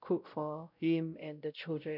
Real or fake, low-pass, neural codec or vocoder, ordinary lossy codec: fake; 5.4 kHz; codec, 16 kHz, 0.5 kbps, X-Codec, WavLM features, trained on Multilingual LibriSpeech; none